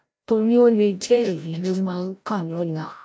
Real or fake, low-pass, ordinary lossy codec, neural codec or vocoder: fake; none; none; codec, 16 kHz, 0.5 kbps, FreqCodec, larger model